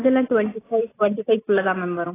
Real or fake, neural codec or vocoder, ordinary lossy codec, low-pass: real; none; AAC, 16 kbps; 3.6 kHz